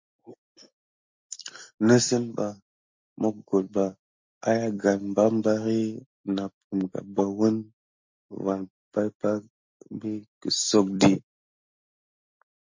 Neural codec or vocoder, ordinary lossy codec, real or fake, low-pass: none; MP3, 48 kbps; real; 7.2 kHz